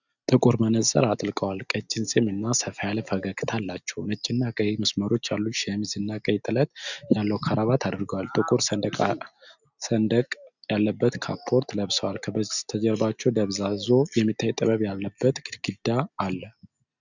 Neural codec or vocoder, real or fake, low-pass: none; real; 7.2 kHz